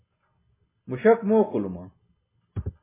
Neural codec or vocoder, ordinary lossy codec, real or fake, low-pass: none; MP3, 16 kbps; real; 3.6 kHz